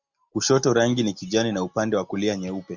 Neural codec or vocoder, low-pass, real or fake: none; 7.2 kHz; real